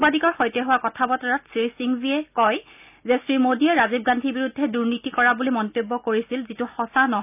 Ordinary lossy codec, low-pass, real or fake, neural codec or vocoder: none; 3.6 kHz; real; none